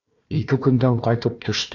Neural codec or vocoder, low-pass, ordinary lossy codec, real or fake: codec, 16 kHz, 1 kbps, FunCodec, trained on Chinese and English, 50 frames a second; 7.2 kHz; AAC, 48 kbps; fake